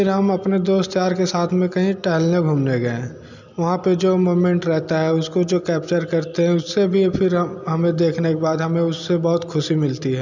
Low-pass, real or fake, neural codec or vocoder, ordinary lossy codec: 7.2 kHz; real; none; none